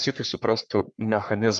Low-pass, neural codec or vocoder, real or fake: 10.8 kHz; codec, 44.1 kHz, 3.4 kbps, Pupu-Codec; fake